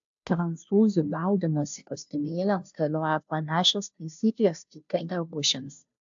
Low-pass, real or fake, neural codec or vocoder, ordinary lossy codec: 7.2 kHz; fake; codec, 16 kHz, 0.5 kbps, FunCodec, trained on Chinese and English, 25 frames a second; AAC, 64 kbps